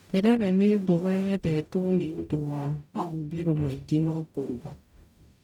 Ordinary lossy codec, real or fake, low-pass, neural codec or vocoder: none; fake; 19.8 kHz; codec, 44.1 kHz, 0.9 kbps, DAC